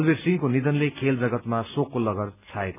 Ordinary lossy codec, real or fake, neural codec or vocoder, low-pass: none; real; none; 3.6 kHz